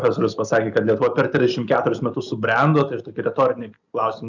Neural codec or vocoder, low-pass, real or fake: none; 7.2 kHz; real